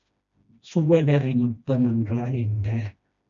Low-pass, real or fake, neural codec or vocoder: 7.2 kHz; fake; codec, 16 kHz, 1 kbps, FreqCodec, smaller model